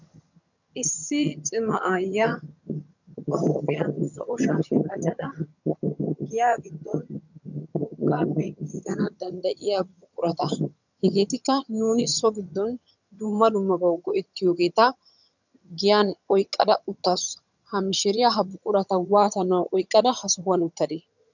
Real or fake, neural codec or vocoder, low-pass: fake; vocoder, 22.05 kHz, 80 mel bands, HiFi-GAN; 7.2 kHz